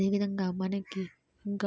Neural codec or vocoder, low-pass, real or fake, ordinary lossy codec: none; none; real; none